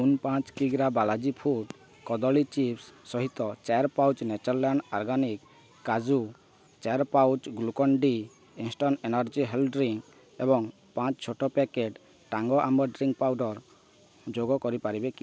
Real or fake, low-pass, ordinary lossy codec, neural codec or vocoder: real; none; none; none